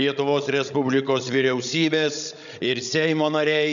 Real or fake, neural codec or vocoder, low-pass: fake; codec, 16 kHz, 16 kbps, FreqCodec, larger model; 7.2 kHz